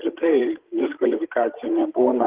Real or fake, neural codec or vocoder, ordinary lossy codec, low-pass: fake; codec, 16 kHz, 16 kbps, FreqCodec, larger model; Opus, 16 kbps; 3.6 kHz